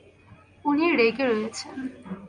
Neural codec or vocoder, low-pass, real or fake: none; 9.9 kHz; real